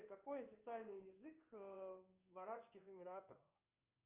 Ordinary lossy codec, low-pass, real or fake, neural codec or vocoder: Opus, 32 kbps; 3.6 kHz; fake; codec, 16 kHz in and 24 kHz out, 1 kbps, XY-Tokenizer